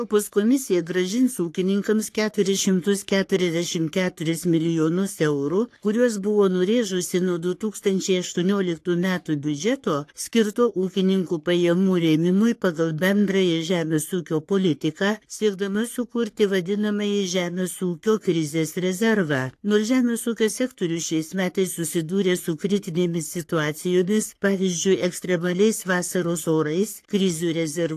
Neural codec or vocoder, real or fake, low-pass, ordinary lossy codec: codec, 44.1 kHz, 3.4 kbps, Pupu-Codec; fake; 14.4 kHz; AAC, 64 kbps